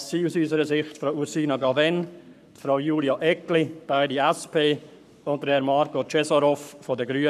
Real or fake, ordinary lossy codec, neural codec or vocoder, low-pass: fake; none; codec, 44.1 kHz, 7.8 kbps, Pupu-Codec; 14.4 kHz